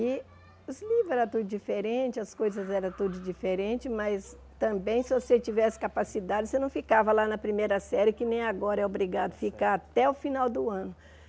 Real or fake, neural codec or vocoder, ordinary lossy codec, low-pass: real; none; none; none